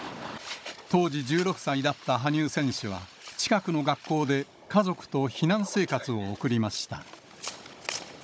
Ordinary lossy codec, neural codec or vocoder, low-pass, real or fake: none; codec, 16 kHz, 16 kbps, FunCodec, trained on Chinese and English, 50 frames a second; none; fake